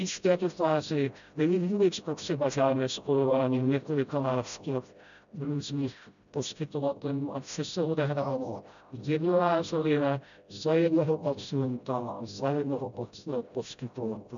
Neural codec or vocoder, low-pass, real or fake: codec, 16 kHz, 0.5 kbps, FreqCodec, smaller model; 7.2 kHz; fake